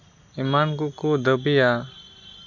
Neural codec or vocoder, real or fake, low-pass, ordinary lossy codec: none; real; 7.2 kHz; none